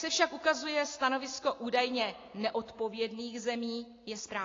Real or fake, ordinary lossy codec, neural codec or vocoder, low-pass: real; AAC, 32 kbps; none; 7.2 kHz